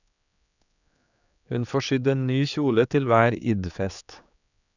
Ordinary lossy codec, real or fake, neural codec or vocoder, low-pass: none; fake; codec, 16 kHz, 4 kbps, X-Codec, HuBERT features, trained on general audio; 7.2 kHz